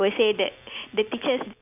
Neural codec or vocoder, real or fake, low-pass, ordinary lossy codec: none; real; 3.6 kHz; none